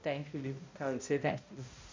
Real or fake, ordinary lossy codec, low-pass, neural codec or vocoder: fake; MP3, 48 kbps; 7.2 kHz; codec, 16 kHz, 0.5 kbps, X-Codec, HuBERT features, trained on balanced general audio